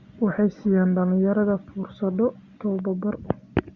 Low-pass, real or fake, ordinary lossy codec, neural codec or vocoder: 7.2 kHz; real; Opus, 64 kbps; none